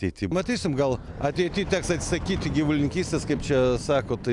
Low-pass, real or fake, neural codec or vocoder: 10.8 kHz; real; none